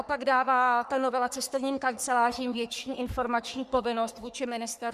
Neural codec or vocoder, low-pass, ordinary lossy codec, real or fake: codec, 44.1 kHz, 3.4 kbps, Pupu-Codec; 14.4 kHz; Opus, 64 kbps; fake